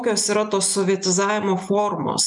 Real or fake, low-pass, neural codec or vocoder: real; 10.8 kHz; none